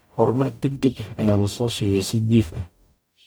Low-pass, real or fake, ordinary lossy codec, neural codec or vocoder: none; fake; none; codec, 44.1 kHz, 0.9 kbps, DAC